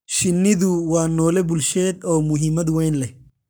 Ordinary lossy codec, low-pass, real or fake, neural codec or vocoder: none; none; fake; codec, 44.1 kHz, 7.8 kbps, Pupu-Codec